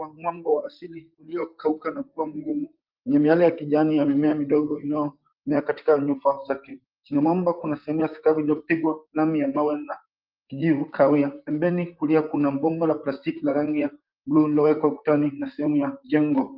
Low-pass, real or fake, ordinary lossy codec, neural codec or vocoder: 5.4 kHz; fake; Opus, 24 kbps; vocoder, 44.1 kHz, 128 mel bands, Pupu-Vocoder